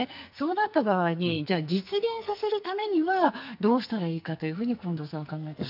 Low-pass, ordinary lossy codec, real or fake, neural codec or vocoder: 5.4 kHz; none; fake; codec, 44.1 kHz, 2.6 kbps, SNAC